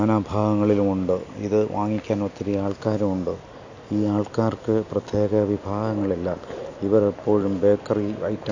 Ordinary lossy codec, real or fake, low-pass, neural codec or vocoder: AAC, 48 kbps; real; 7.2 kHz; none